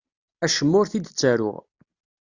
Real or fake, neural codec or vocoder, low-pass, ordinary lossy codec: real; none; 7.2 kHz; Opus, 64 kbps